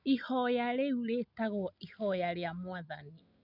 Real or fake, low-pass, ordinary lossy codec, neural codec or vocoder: real; 5.4 kHz; none; none